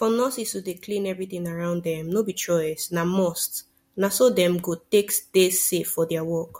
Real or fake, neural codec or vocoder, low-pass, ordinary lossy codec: real; none; 19.8 kHz; MP3, 64 kbps